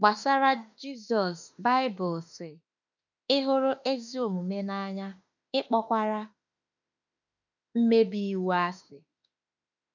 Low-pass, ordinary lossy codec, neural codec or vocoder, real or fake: 7.2 kHz; none; autoencoder, 48 kHz, 32 numbers a frame, DAC-VAE, trained on Japanese speech; fake